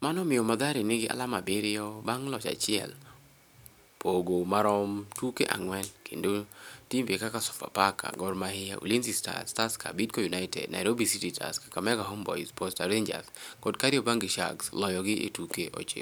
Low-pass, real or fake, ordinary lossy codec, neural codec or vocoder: none; real; none; none